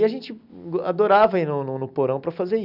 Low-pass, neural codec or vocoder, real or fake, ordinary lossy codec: 5.4 kHz; none; real; none